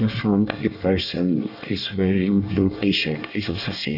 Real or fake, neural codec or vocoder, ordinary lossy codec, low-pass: fake; codec, 24 kHz, 1 kbps, SNAC; none; 5.4 kHz